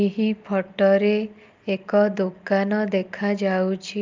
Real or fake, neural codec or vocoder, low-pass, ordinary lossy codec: real; none; 7.2 kHz; Opus, 24 kbps